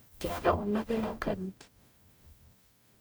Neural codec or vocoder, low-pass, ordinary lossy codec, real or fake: codec, 44.1 kHz, 0.9 kbps, DAC; none; none; fake